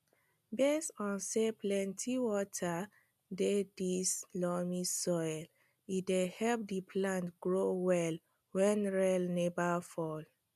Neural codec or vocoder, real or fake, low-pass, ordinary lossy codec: none; real; 14.4 kHz; none